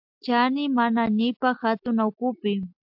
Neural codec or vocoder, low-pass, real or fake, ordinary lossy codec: none; 5.4 kHz; real; AAC, 48 kbps